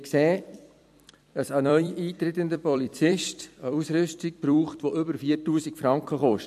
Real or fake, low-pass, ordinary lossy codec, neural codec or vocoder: fake; 14.4 kHz; MP3, 64 kbps; vocoder, 44.1 kHz, 128 mel bands every 256 samples, BigVGAN v2